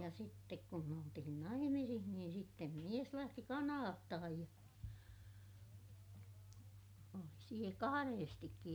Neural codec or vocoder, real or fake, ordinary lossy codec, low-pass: none; real; none; none